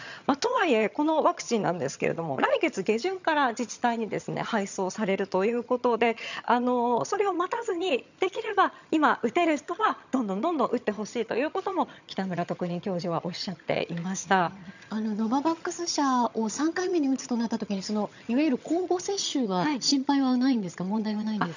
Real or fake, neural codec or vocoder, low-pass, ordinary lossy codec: fake; vocoder, 22.05 kHz, 80 mel bands, HiFi-GAN; 7.2 kHz; none